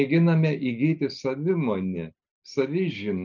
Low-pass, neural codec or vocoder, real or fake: 7.2 kHz; none; real